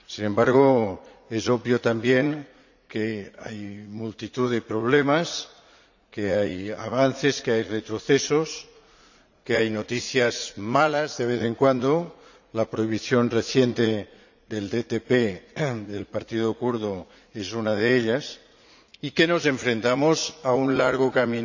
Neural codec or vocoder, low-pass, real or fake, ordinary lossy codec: vocoder, 44.1 kHz, 80 mel bands, Vocos; 7.2 kHz; fake; none